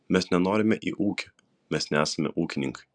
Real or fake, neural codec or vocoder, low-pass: real; none; 9.9 kHz